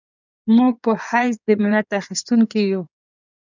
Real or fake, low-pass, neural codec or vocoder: fake; 7.2 kHz; codec, 16 kHz in and 24 kHz out, 2.2 kbps, FireRedTTS-2 codec